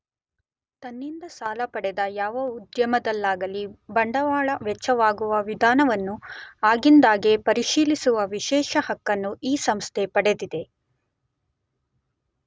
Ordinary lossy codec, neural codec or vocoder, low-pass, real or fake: none; none; none; real